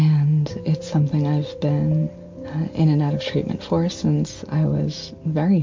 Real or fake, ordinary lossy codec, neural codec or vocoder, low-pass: real; MP3, 48 kbps; none; 7.2 kHz